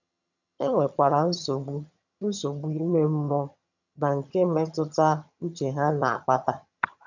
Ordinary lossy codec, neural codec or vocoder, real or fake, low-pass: none; vocoder, 22.05 kHz, 80 mel bands, HiFi-GAN; fake; 7.2 kHz